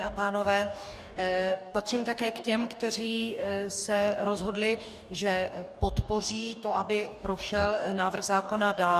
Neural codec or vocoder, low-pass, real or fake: codec, 44.1 kHz, 2.6 kbps, DAC; 14.4 kHz; fake